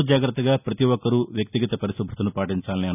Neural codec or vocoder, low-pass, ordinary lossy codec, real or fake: none; 3.6 kHz; none; real